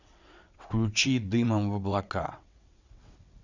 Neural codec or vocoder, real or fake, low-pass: vocoder, 22.05 kHz, 80 mel bands, WaveNeXt; fake; 7.2 kHz